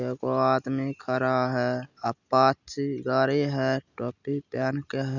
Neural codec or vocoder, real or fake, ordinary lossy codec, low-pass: none; real; none; 7.2 kHz